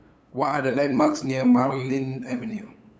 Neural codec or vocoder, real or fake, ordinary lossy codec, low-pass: codec, 16 kHz, 8 kbps, FunCodec, trained on LibriTTS, 25 frames a second; fake; none; none